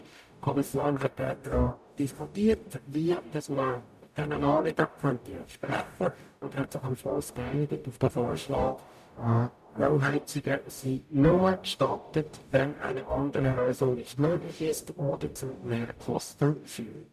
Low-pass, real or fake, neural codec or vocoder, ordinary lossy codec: 14.4 kHz; fake; codec, 44.1 kHz, 0.9 kbps, DAC; none